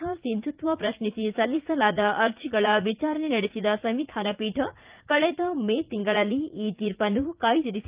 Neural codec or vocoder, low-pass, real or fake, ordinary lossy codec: vocoder, 44.1 kHz, 80 mel bands, Vocos; 3.6 kHz; fake; Opus, 32 kbps